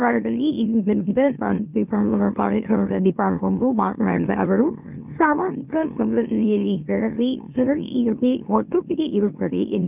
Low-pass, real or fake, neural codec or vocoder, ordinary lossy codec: 3.6 kHz; fake; autoencoder, 44.1 kHz, a latent of 192 numbers a frame, MeloTTS; none